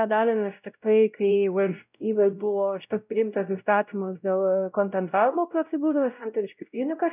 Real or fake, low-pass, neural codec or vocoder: fake; 3.6 kHz; codec, 16 kHz, 0.5 kbps, X-Codec, WavLM features, trained on Multilingual LibriSpeech